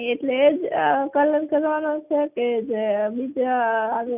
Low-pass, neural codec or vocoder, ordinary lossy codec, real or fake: 3.6 kHz; none; none; real